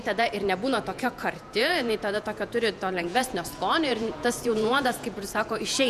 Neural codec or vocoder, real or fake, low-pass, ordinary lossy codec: vocoder, 48 kHz, 128 mel bands, Vocos; fake; 14.4 kHz; MP3, 96 kbps